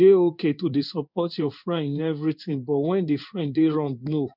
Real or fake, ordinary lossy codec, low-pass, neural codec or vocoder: fake; none; 5.4 kHz; codec, 16 kHz in and 24 kHz out, 1 kbps, XY-Tokenizer